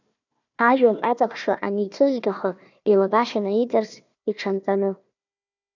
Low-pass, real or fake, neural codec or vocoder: 7.2 kHz; fake; codec, 16 kHz, 1 kbps, FunCodec, trained on Chinese and English, 50 frames a second